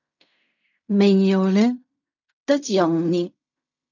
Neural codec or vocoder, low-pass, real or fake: codec, 16 kHz in and 24 kHz out, 0.4 kbps, LongCat-Audio-Codec, fine tuned four codebook decoder; 7.2 kHz; fake